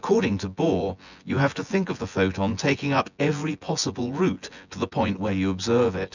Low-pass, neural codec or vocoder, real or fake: 7.2 kHz; vocoder, 24 kHz, 100 mel bands, Vocos; fake